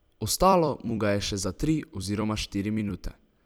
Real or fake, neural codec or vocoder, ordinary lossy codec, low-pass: fake; vocoder, 44.1 kHz, 128 mel bands every 256 samples, BigVGAN v2; none; none